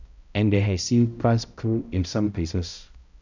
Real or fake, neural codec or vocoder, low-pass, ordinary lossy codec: fake; codec, 16 kHz, 0.5 kbps, X-Codec, HuBERT features, trained on balanced general audio; 7.2 kHz; none